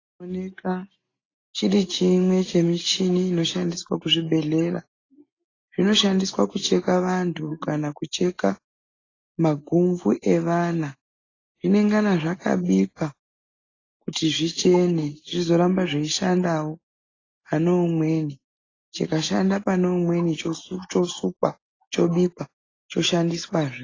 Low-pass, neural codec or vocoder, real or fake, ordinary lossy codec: 7.2 kHz; none; real; AAC, 32 kbps